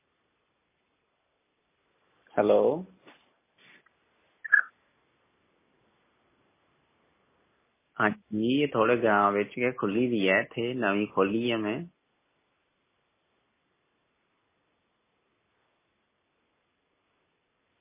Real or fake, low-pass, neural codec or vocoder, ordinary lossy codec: real; 3.6 kHz; none; MP3, 16 kbps